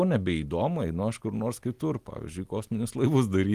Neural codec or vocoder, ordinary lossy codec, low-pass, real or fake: none; Opus, 24 kbps; 14.4 kHz; real